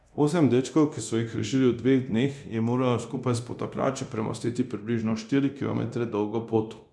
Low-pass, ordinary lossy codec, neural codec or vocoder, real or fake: none; none; codec, 24 kHz, 0.9 kbps, DualCodec; fake